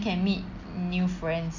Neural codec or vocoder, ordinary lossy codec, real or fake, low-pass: none; none; real; 7.2 kHz